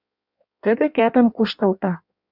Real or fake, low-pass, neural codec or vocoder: fake; 5.4 kHz; codec, 16 kHz in and 24 kHz out, 1.1 kbps, FireRedTTS-2 codec